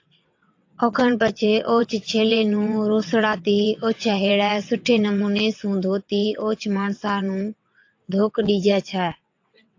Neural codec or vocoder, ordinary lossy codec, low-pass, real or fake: vocoder, 22.05 kHz, 80 mel bands, WaveNeXt; AAC, 48 kbps; 7.2 kHz; fake